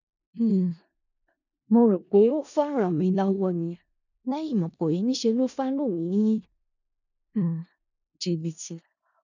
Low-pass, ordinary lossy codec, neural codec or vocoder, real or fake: 7.2 kHz; none; codec, 16 kHz in and 24 kHz out, 0.4 kbps, LongCat-Audio-Codec, four codebook decoder; fake